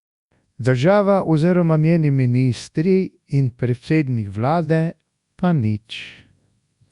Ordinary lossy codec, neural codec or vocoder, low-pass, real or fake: Opus, 64 kbps; codec, 24 kHz, 0.9 kbps, WavTokenizer, large speech release; 10.8 kHz; fake